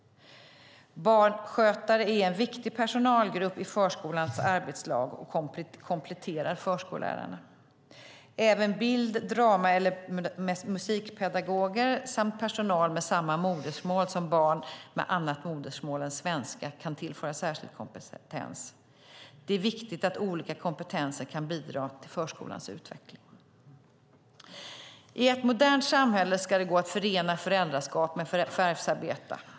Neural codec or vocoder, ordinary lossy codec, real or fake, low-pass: none; none; real; none